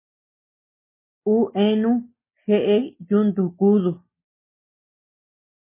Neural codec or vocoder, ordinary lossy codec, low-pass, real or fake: none; MP3, 16 kbps; 3.6 kHz; real